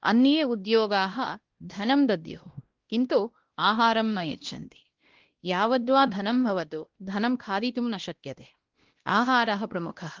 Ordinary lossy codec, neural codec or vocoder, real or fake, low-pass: Opus, 16 kbps; codec, 16 kHz, 1 kbps, X-Codec, WavLM features, trained on Multilingual LibriSpeech; fake; 7.2 kHz